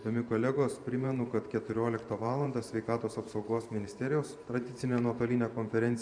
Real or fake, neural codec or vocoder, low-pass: fake; vocoder, 44.1 kHz, 128 mel bands every 512 samples, BigVGAN v2; 9.9 kHz